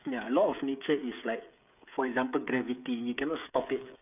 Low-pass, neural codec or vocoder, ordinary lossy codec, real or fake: 3.6 kHz; codec, 16 kHz, 8 kbps, FreqCodec, smaller model; none; fake